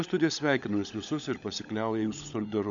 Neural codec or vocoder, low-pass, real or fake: codec, 16 kHz, 16 kbps, FunCodec, trained on LibriTTS, 50 frames a second; 7.2 kHz; fake